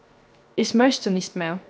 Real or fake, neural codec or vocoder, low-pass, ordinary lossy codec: fake; codec, 16 kHz, 0.7 kbps, FocalCodec; none; none